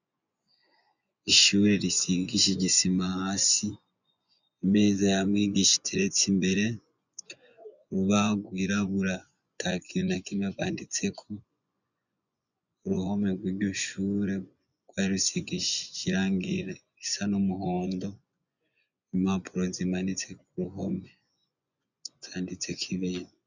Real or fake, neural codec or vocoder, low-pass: fake; vocoder, 24 kHz, 100 mel bands, Vocos; 7.2 kHz